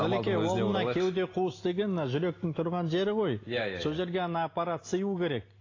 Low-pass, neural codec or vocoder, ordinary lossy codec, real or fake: 7.2 kHz; none; AAC, 32 kbps; real